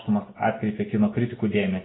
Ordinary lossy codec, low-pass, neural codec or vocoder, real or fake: AAC, 16 kbps; 7.2 kHz; none; real